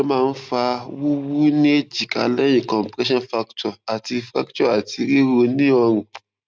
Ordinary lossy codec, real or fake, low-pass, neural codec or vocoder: none; real; none; none